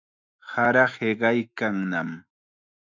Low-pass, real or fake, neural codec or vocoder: 7.2 kHz; fake; autoencoder, 48 kHz, 128 numbers a frame, DAC-VAE, trained on Japanese speech